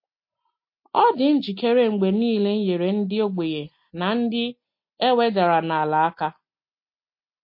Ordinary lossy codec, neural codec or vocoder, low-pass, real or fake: MP3, 32 kbps; none; 5.4 kHz; real